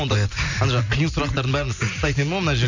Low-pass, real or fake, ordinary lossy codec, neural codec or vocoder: 7.2 kHz; real; none; none